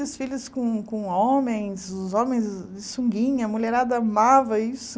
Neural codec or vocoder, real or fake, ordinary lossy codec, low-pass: none; real; none; none